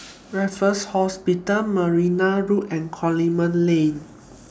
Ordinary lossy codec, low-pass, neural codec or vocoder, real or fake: none; none; none; real